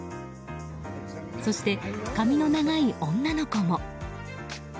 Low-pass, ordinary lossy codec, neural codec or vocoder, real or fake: none; none; none; real